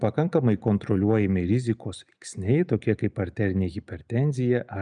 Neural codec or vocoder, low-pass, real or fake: none; 9.9 kHz; real